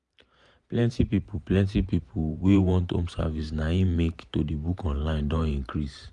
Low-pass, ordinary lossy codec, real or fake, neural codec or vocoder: 10.8 kHz; none; fake; vocoder, 44.1 kHz, 128 mel bands every 512 samples, BigVGAN v2